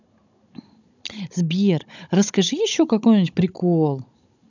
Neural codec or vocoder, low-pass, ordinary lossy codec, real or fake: codec, 16 kHz, 16 kbps, FunCodec, trained on Chinese and English, 50 frames a second; 7.2 kHz; none; fake